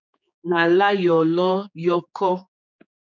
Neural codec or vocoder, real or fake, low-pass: codec, 16 kHz, 4 kbps, X-Codec, HuBERT features, trained on general audio; fake; 7.2 kHz